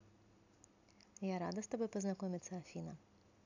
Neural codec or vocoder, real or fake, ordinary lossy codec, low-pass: none; real; none; 7.2 kHz